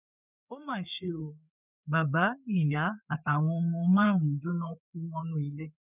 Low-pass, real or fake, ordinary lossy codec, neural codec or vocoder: 3.6 kHz; fake; none; codec, 16 kHz, 4 kbps, FreqCodec, larger model